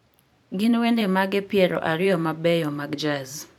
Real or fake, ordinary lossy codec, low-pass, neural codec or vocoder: fake; none; 19.8 kHz; vocoder, 44.1 kHz, 128 mel bands every 512 samples, BigVGAN v2